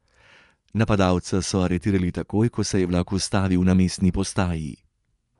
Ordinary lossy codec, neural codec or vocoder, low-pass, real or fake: none; vocoder, 24 kHz, 100 mel bands, Vocos; 10.8 kHz; fake